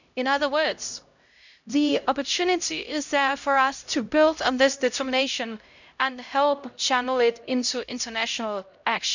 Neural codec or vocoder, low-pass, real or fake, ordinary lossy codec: codec, 16 kHz, 0.5 kbps, X-Codec, HuBERT features, trained on LibriSpeech; 7.2 kHz; fake; none